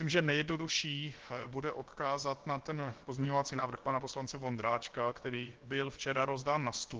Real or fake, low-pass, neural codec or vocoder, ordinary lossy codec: fake; 7.2 kHz; codec, 16 kHz, about 1 kbps, DyCAST, with the encoder's durations; Opus, 16 kbps